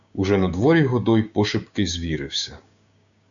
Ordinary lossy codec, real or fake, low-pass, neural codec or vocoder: MP3, 96 kbps; fake; 7.2 kHz; codec, 16 kHz, 6 kbps, DAC